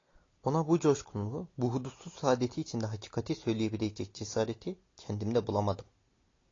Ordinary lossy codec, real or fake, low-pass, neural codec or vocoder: AAC, 32 kbps; real; 7.2 kHz; none